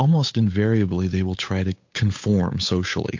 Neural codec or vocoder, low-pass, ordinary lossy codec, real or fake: codec, 16 kHz, 8 kbps, FunCodec, trained on Chinese and English, 25 frames a second; 7.2 kHz; MP3, 48 kbps; fake